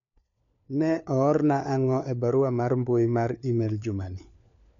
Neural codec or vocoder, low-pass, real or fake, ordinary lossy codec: codec, 16 kHz, 4 kbps, FunCodec, trained on LibriTTS, 50 frames a second; 7.2 kHz; fake; none